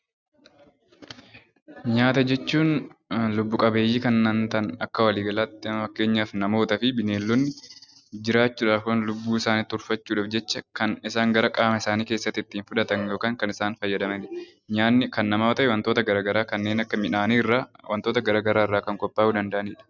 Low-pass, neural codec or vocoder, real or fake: 7.2 kHz; none; real